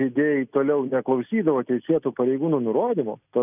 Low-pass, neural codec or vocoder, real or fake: 3.6 kHz; none; real